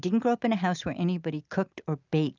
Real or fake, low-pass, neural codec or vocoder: real; 7.2 kHz; none